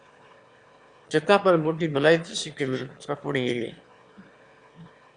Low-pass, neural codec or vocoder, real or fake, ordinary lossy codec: 9.9 kHz; autoencoder, 22.05 kHz, a latent of 192 numbers a frame, VITS, trained on one speaker; fake; Opus, 64 kbps